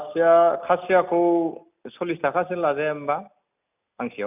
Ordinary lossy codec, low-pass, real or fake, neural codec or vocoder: none; 3.6 kHz; real; none